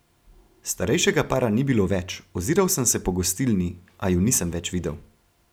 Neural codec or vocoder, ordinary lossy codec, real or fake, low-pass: none; none; real; none